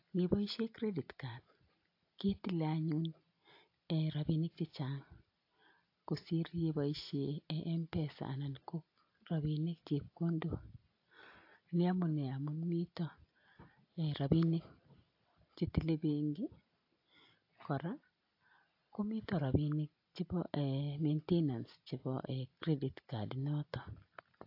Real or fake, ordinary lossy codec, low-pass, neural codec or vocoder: real; AAC, 32 kbps; 5.4 kHz; none